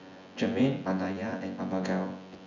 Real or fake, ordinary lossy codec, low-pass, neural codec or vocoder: fake; none; 7.2 kHz; vocoder, 24 kHz, 100 mel bands, Vocos